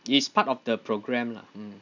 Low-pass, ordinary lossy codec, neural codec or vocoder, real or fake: 7.2 kHz; none; none; real